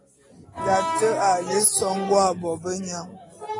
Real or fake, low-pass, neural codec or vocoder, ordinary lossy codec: real; 10.8 kHz; none; AAC, 32 kbps